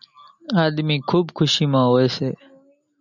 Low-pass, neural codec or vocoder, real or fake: 7.2 kHz; none; real